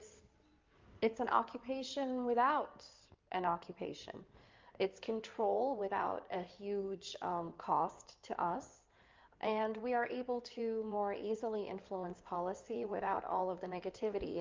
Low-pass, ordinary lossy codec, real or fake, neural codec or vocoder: 7.2 kHz; Opus, 24 kbps; fake; codec, 16 kHz in and 24 kHz out, 2.2 kbps, FireRedTTS-2 codec